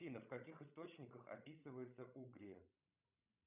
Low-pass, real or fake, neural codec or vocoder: 3.6 kHz; fake; codec, 16 kHz, 16 kbps, FreqCodec, larger model